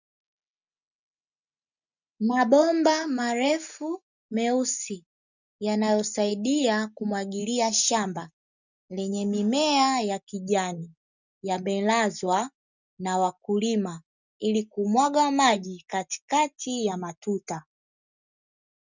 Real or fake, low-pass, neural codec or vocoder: real; 7.2 kHz; none